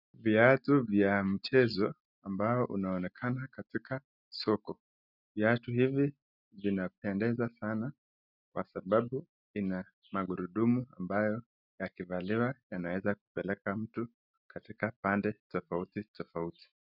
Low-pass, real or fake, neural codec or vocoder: 5.4 kHz; real; none